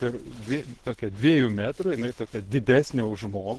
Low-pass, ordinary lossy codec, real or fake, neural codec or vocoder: 10.8 kHz; Opus, 16 kbps; fake; codec, 24 kHz, 3 kbps, HILCodec